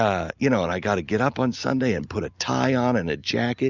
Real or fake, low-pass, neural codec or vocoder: real; 7.2 kHz; none